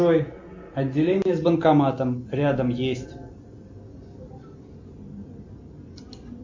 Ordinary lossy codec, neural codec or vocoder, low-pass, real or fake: MP3, 48 kbps; none; 7.2 kHz; real